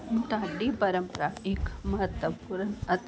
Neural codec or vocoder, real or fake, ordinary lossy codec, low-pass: none; real; none; none